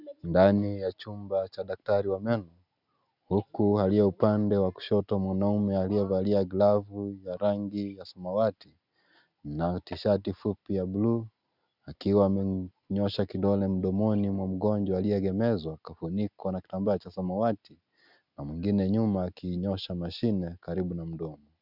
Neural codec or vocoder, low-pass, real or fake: none; 5.4 kHz; real